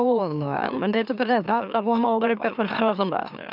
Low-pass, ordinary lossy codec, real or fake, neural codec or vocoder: 5.4 kHz; none; fake; autoencoder, 44.1 kHz, a latent of 192 numbers a frame, MeloTTS